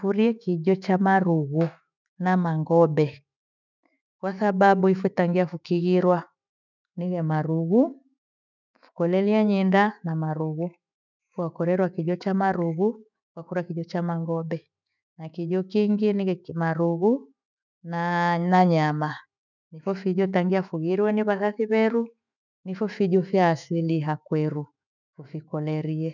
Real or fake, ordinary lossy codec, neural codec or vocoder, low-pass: fake; none; autoencoder, 48 kHz, 128 numbers a frame, DAC-VAE, trained on Japanese speech; 7.2 kHz